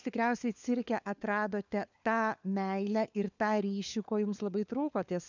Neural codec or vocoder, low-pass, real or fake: codec, 16 kHz, 4 kbps, FunCodec, trained on LibriTTS, 50 frames a second; 7.2 kHz; fake